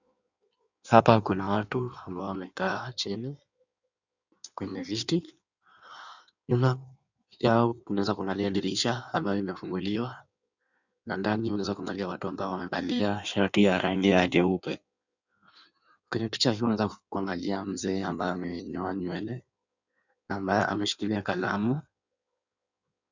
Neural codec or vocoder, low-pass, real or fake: codec, 16 kHz in and 24 kHz out, 1.1 kbps, FireRedTTS-2 codec; 7.2 kHz; fake